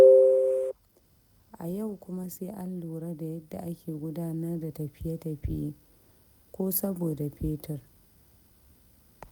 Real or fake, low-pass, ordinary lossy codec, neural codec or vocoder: real; 19.8 kHz; none; none